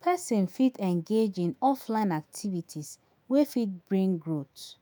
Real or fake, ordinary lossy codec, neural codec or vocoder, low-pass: fake; none; autoencoder, 48 kHz, 128 numbers a frame, DAC-VAE, trained on Japanese speech; none